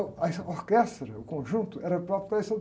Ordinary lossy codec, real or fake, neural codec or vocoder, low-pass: none; real; none; none